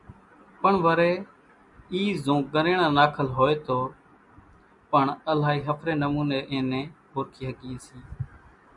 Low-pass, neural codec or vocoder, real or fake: 10.8 kHz; none; real